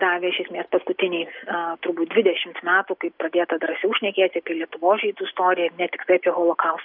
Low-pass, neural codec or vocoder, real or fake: 5.4 kHz; none; real